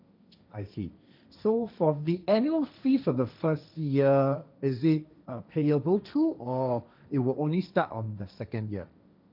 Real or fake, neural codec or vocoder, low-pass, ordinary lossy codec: fake; codec, 16 kHz, 1.1 kbps, Voila-Tokenizer; 5.4 kHz; none